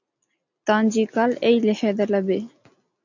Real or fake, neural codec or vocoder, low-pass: real; none; 7.2 kHz